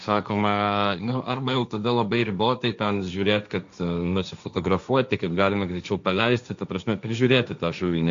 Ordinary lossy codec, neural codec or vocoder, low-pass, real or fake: MP3, 64 kbps; codec, 16 kHz, 1.1 kbps, Voila-Tokenizer; 7.2 kHz; fake